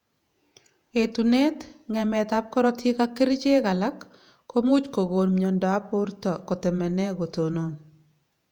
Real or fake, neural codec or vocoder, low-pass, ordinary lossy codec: fake; vocoder, 48 kHz, 128 mel bands, Vocos; 19.8 kHz; none